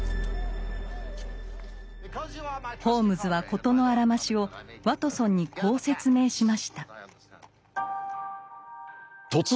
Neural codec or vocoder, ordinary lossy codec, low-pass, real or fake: none; none; none; real